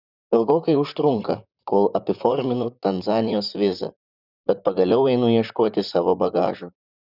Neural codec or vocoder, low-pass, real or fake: vocoder, 44.1 kHz, 128 mel bands, Pupu-Vocoder; 5.4 kHz; fake